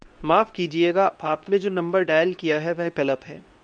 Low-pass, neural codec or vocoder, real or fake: 9.9 kHz; codec, 24 kHz, 0.9 kbps, WavTokenizer, medium speech release version 2; fake